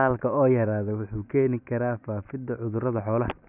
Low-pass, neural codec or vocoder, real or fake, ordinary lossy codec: 3.6 kHz; none; real; none